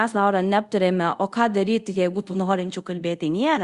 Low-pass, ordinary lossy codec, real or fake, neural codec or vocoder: 10.8 kHz; Opus, 64 kbps; fake; codec, 24 kHz, 0.5 kbps, DualCodec